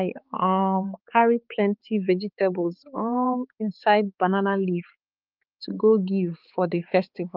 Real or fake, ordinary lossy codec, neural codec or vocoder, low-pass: fake; Opus, 32 kbps; codec, 16 kHz, 4 kbps, X-Codec, HuBERT features, trained on balanced general audio; 5.4 kHz